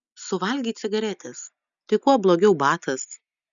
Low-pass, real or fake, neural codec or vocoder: 7.2 kHz; real; none